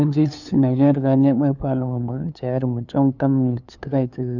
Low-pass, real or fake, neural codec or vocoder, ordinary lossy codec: 7.2 kHz; fake; codec, 16 kHz, 2 kbps, FunCodec, trained on LibriTTS, 25 frames a second; none